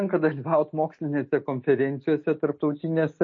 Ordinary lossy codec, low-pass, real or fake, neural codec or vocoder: MP3, 32 kbps; 7.2 kHz; real; none